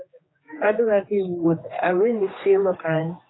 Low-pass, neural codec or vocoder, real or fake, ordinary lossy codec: 7.2 kHz; codec, 16 kHz, 2 kbps, X-Codec, HuBERT features, trained on general audio; fake; AAC, 16 kbps